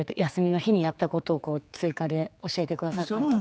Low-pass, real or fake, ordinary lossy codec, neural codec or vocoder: none; fake; none; codec, 16 kHz, 4 kbps, X-Codec, HuBERT features, trained on general audio